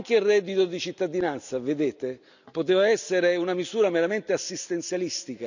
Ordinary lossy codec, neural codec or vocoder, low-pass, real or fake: none; none; 7.2 kHz; real